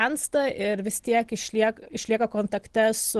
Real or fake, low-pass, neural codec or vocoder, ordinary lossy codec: real; 10.8 kHz; none; Opus, 16 kbps